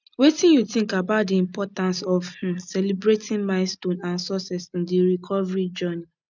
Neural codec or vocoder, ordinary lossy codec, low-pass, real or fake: none; none; 7.2 kHz; real